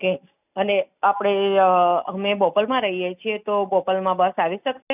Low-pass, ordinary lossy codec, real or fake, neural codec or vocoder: 3.6 kHz; none; real; none